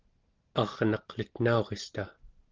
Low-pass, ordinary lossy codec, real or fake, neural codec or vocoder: 7.2 kHz; Opus, 16 kbps; real; none